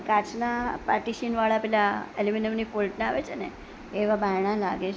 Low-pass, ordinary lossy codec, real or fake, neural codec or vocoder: none; none; real; none